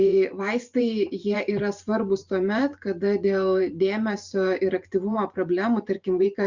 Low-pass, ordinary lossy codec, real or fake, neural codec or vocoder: 7.2 kHz; Opus, 64 kbps; fake; vocoder, 44.1 kHz, 128 mel bands every 512 samples, BigVGAN v2